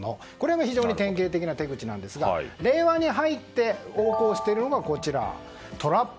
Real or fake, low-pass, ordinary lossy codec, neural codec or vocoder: real; none; none; none